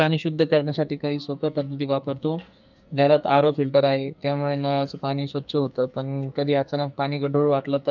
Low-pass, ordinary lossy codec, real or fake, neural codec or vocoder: 7.2 kHz; none; fake; codec, 44.1 kHz, 2.6 kbps, SNAC